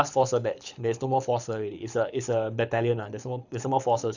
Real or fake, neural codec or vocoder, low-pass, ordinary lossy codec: fake; codec, 24 kHz, 6 kbps, HILCodec; 7.2 kHz; none